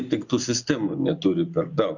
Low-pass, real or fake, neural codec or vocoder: 7.2 kHz; real; none